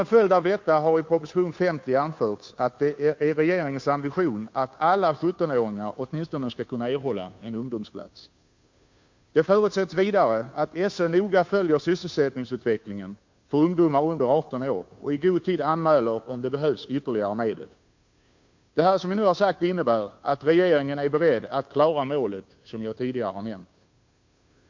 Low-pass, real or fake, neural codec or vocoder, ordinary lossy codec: 7.2 kHz; fake; codec, 16 kHz, 2 kbps, FunCodec, trained on Chinese and English, 25 frames a second; MP3, 48 kbps